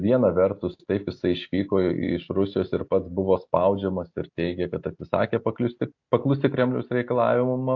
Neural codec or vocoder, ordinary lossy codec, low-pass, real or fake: none; AAC, 48 kbps; 7.2 kHz; real